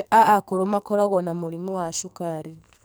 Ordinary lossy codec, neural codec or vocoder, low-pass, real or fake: none; codec, 44.1 kHz, 2.6 kbps, SNAC; none; fake